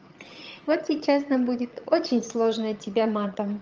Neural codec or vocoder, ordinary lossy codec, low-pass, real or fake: vocoder, 22.05 kHz, 80 mel bands, HiFi-GAN; Opus, 24 kbps; 7.2 kHz; fake